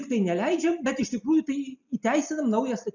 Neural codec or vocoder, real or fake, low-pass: none; real; 7.2 kHz